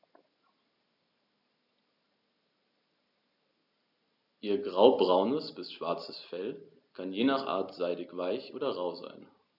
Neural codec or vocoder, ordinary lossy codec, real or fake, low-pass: none; none; real; 5.4 kHz